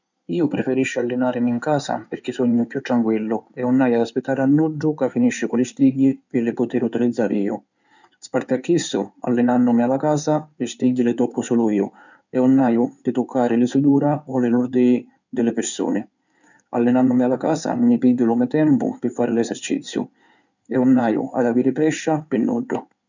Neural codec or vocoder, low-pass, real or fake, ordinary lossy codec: codec, 16 kHz in and 24 kHz out, 2.2 kbps, FireRedTTS-2 codec; 7.2 kHz; fake; none